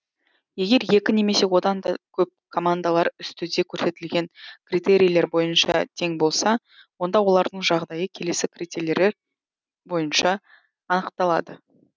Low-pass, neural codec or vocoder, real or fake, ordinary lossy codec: none; none; real; none